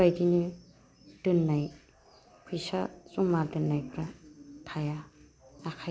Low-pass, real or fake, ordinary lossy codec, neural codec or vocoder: none; real; none; none